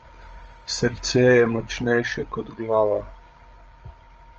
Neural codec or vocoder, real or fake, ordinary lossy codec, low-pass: codec, 16 kHz, 16 kbps, FreqCodec, larger model; fake; Opus, 24 kbps; 7.2 kHz